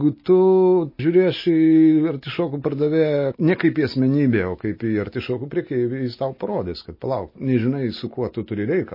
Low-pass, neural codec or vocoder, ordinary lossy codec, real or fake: 5.4 kHz; none; MP3, 24 kbps; real